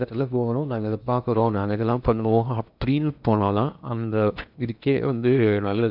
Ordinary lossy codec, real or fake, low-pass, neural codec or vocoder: none; fake; 5.4 kHz; codec, 16 kHz in and 24 kHz out, 0.8 kbps, FocalCodec, streaming, 65536 codes